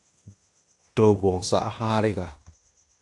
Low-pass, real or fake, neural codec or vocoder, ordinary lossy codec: 10.8 kHz; fake; codec, 16 kHz in and 24 kHz out, 0.9 kbps, LongCat-Audio-Codec, fine tuned four codebook decoder; AAC, 64 kbps